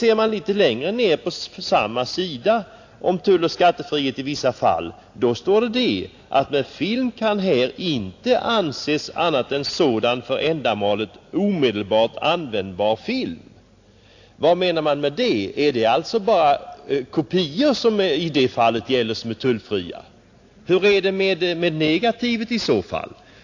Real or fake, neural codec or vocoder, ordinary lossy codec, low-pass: real; none; AAC, 48 kbps; 7.2 kHz